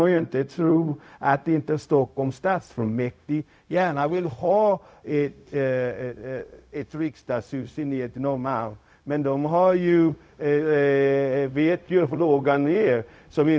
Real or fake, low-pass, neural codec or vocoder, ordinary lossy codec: fake; none; codec, 16 kHz, 0.4 kbps, LongCat-Audio-Codec; none